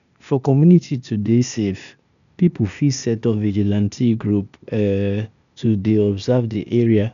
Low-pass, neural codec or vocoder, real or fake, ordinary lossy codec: 7.2 kHz; codec, 16 kHz, 0.8 kbps, ZipCodec; fake; none